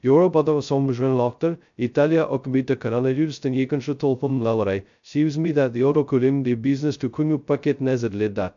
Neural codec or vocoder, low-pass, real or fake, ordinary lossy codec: codec, 16 kHz, 0.2 kbps, FocalCodec; 7.2 kHz; fake; MP3, 64 kbps